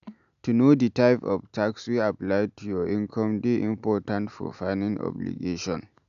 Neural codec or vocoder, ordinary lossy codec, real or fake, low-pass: none; none; real; 7.2 kHz